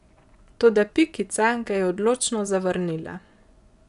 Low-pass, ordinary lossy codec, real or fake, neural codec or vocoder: 10.8 kHz; none; fake; vocoder, 24 kHz, 100 mel bands, Vocos